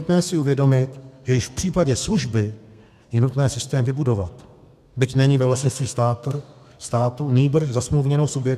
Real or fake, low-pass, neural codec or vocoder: fake; 14.4 kHz; codec, 32 kHz, 1.9 kbps, SNAC